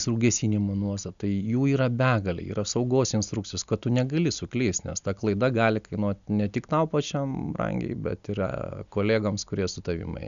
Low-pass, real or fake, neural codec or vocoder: 7.2 kHz; real; none